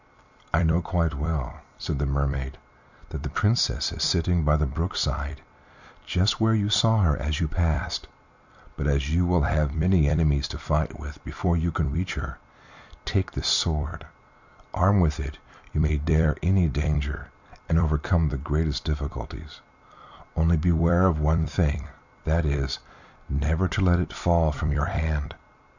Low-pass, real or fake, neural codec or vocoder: 7.2 kHz; fake; vocoder, 44.1 kHz, 128 mel bands every 256 samples, BigVGAN v2